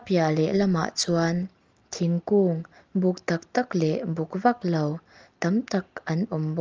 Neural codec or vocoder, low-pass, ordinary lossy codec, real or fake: none; 7.2 kHz; Opus, 24 kbps; real